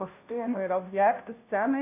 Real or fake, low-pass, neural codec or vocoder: fake; 3.6 kHz; codec, 16 kHz, 0.5 kbps, FunCodec, trained on Chinese and English, 25 frames a second